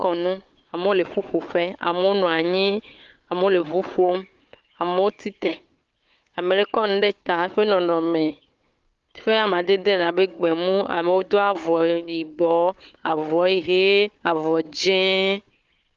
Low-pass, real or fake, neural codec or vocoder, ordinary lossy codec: 7.2 kHz; fake; codec, 16 kHz, 4 kbps, FunCodec, trained on Chinese and English, 50 frames a second; Opus, 32 kbps